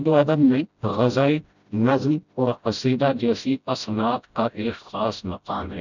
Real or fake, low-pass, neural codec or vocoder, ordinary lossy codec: fake; 7.2 kHz; codec, 16 kHz, 0.5 kbps, FreqCodec, smaller model; none